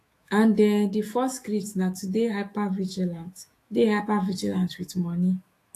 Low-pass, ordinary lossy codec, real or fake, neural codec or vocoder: 14.4 kHz; AAC, 48 kbps; fake; autoencoder, 48 kHz, 128 numbers a frame, DAC-VAE, trained on Japanese speech